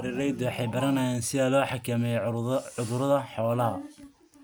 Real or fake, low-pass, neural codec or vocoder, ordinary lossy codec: real; none; none; none